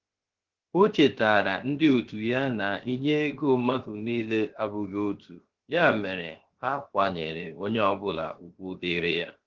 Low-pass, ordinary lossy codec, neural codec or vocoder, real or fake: 7.2 kHz; Opus, 16 kbps; codec, 16 kHz, 0.7 kbps, FocalCodec; fake